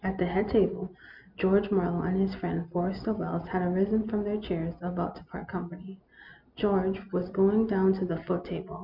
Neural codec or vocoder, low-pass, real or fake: none; 5.4 kHz; real